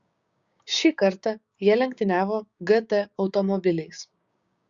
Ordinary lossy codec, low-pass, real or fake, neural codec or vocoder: Opus, 64 kbps; 7.2 kHz; fake; codec, 16 kHz, 6 kbps, DAC